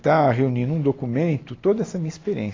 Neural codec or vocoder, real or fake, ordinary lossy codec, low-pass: none; real; AAC, 32 kbps; 7.2 kHz